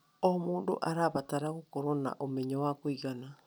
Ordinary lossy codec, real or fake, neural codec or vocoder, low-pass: none; real; none; none